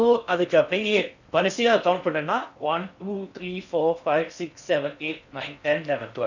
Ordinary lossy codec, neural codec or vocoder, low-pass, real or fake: none; codec, 16 kHz in and 24 kHz out, 0.8 kbps, FocalCodec, streaming, 65536 codes; 7.2 kHz; fake